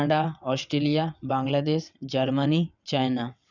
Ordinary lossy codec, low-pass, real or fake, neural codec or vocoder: none; 7.2 kHz; fake; vocoder, 22.05 kHz, 80 mel bands, WaveNeXt